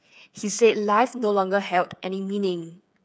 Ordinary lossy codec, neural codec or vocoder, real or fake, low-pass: none; codec, 16 kHz, 8 kbps, FreqCodec, smaller model; fake; none